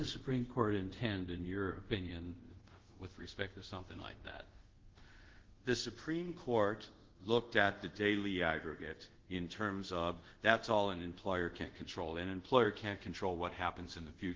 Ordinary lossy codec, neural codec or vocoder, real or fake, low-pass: Opus, 16 kbps; codec, 24 kHz, 0.5 kbps, DualCodec; fake; 7.2 kHz